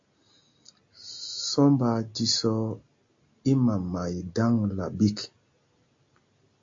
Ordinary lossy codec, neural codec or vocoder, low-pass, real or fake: AAC, 48 kbps; none; 7.2 kHz; real